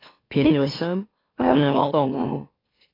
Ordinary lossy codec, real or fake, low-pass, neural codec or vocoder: AAC, 24 kbps; fake; 5.4 kHz; autoencoder, 44.1 kHz, a latent of 192 numbers a frame, MeloTTS